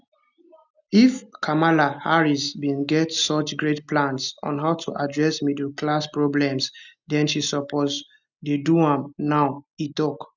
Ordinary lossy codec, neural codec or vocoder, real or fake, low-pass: none; none; real; 7.2 kHz